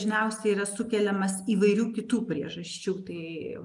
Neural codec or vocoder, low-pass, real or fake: none; 10.8 kHz; real